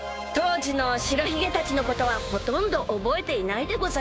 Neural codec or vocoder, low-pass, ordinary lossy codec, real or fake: codec, 16 kHz, 6 kbps, DAC; none; none; fake